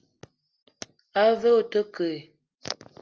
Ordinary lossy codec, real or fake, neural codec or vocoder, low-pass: Opus, 24 kbps; real; none; 7.2 kHz